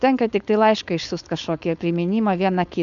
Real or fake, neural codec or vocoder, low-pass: fake; codec, 16 kHz, 4.8 kbps, FACodec; 7.2 kHz